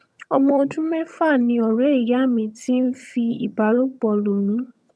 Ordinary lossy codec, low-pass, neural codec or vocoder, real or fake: none; none; vocoder, 22.05 kHz, 80 mel bands, HiFi-GAN; fake